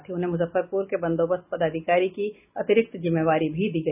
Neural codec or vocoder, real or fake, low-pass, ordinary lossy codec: none; real; 3.6 kHz; none